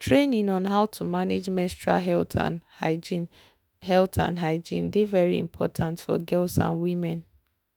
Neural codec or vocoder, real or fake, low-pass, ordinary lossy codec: autoencoder, 48 kHz, 32 numbers a frame, DAC-VAE, trained on Japanese speech; fake; none; none